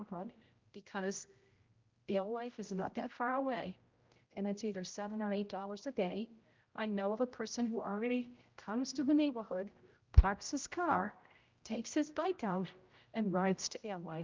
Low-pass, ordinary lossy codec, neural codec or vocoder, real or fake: 7.2 kHz; Opus, 24 kbps; codec, 16 kHz, 0.5 kbps, X-Codec, HuBERT features, trained on general audio; fake